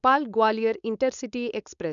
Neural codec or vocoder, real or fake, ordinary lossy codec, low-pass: codec, 16 kHz, 4.8 kbps, FACodec; fake; none; 7.2 kHz